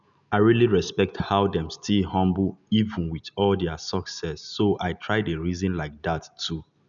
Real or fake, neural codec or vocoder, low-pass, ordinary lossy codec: real; none; 7.2 kHz; none